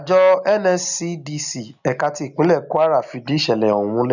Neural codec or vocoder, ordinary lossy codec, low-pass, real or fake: none; none; 7.2 kHz; real